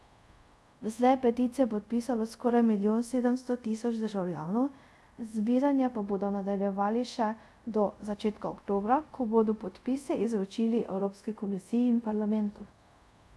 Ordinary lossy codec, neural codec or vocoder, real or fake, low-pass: none; codec, 24 kHz, 0.5 kbps, DualCodec; fake; none